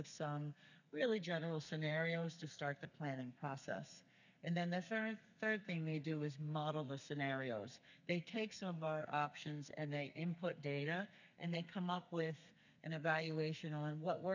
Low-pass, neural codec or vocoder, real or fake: 7.2 kHz; codec, 32 kHz, 1.9 kbps, SNAC; fake